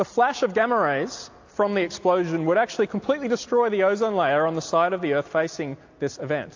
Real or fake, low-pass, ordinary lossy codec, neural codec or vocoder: real; 7.2 kHz; AAC, 48 kbps; none